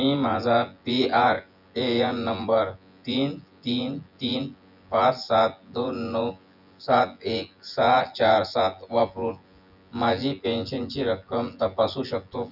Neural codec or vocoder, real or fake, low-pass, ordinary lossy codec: vocoder, 24 kHz, 100 mel bands, Vocos; fake; 5.4 kHz; none